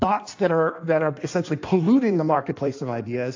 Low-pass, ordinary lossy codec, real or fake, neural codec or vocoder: 7.2 kHz; AAC, 48 kbps; fake; codec, 16 kHz in and 24 kHz out, 1.1 kbps, FireRedTTS-2 codec